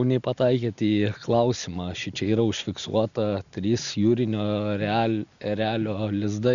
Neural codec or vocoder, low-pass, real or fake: none; 7.2 kHz; real